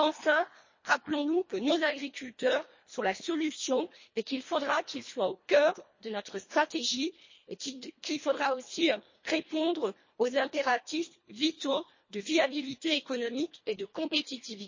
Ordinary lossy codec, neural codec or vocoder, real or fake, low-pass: MP3, 32 kbps; codec, 24 kHz, 1.5 kbps, HILCodec; fake; 7.2 kHz